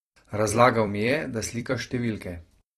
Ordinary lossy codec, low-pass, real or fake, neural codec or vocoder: AAC, 32 kbps; 19.8 kHz; fake; vocoder, 44.1 kHz, 128 mel bands every 256 samples, BigVGAN v2